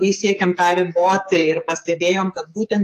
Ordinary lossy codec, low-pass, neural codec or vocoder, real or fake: AAC, 64 kbps; 14.4 kHz; codec, 44.1 kHz, 2.6 kbps, SNAC; fake